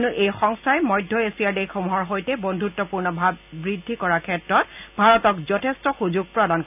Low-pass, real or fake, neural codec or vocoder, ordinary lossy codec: 3.6 kHz; real; none; none